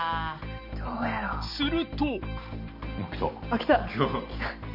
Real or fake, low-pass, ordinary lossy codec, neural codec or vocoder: real; 5.4 kHz; none; none